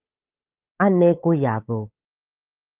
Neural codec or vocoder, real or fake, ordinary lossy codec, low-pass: codec, 16 kHz, 8 kbps, FunCodec, trained on Chinese and English, 25 frames a second; fake; Opus, 24 kbps; 3.6 kHz